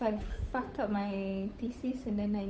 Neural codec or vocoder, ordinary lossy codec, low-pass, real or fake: codec, 16 kHz, 8 kbps, FunCodec, trained on Chinese and English, 25 frames a second; none; none; fake